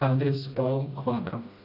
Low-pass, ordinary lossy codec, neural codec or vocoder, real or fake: 5.4 kHz; AAC, 32 kbps; codec, 16 kHz, 1 kbps, FreqCodec, smaller model; fake